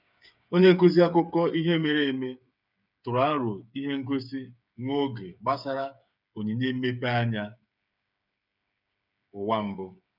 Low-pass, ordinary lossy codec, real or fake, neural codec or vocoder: 5.4 kHz; none; fake; codec, 16 kHz, 8 kbps, FreqCodec, smaller model